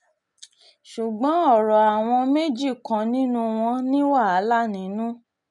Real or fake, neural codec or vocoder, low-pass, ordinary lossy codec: real; none; 10.8 kHz; none